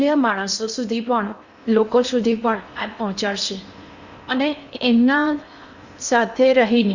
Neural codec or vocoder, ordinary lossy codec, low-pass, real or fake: codec, 16 kHz in and 24 kHz out, 0.8 kbps, FocalCodec, streaming, 65536 codes; Opus, 64 kbps; 7.2 kHz; fake